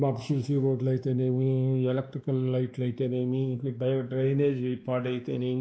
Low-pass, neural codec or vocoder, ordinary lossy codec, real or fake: none; codec, 16 kHz, 2 kbps, X-Codec, WavLM features, trained on Multilingual LibriSpeech; none; fake